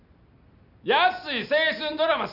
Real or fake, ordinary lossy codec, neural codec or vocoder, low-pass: real; none; none; 5.4 kHz